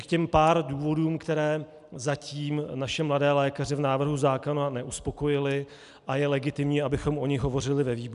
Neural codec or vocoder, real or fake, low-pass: none; real; 10.8 kHz